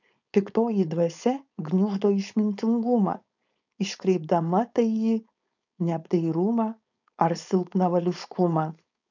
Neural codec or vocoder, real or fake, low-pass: codec, 16 kHz, 4.8 kbps, FACodec; fake; 7.2 kHz